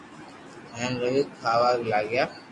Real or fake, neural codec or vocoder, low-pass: real; none; 10.8 kHz